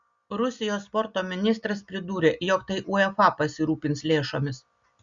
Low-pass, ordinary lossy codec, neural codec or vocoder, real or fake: 7.2 kHz; Opus, 64 kbps; none; real